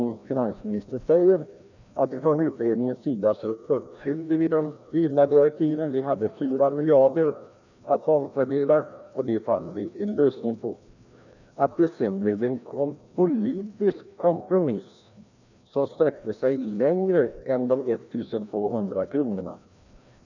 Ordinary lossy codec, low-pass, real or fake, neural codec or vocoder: none; 7.2 kHz; fake; codec, 16 kHz, 1 kbps, FreqCodec, larger model